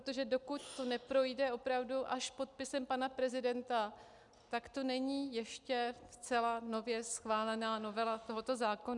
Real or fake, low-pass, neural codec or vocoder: real; 10.8 kHz; none